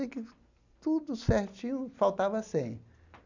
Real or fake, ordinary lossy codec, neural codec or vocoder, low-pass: real; none; none; 7.2 kHz